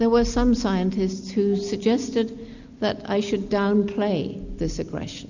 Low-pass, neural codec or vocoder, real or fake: 7.2 kHz; none; real